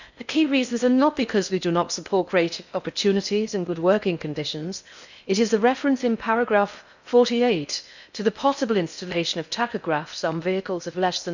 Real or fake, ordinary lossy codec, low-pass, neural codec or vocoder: fake; none; 7.2 kHz; codec, 16 kHz in and 24 kHz out, 0.6 kbps, FocalCodec, streaming, 4096 codes